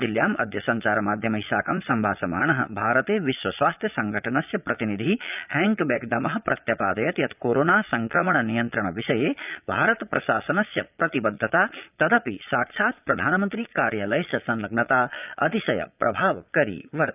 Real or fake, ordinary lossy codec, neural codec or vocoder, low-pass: fake; none; vocoder, 22.05 kHz, 80 mel bands, Vocos; 3.6 kHz